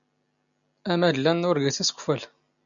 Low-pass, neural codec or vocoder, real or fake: 7.2 kHz; none; real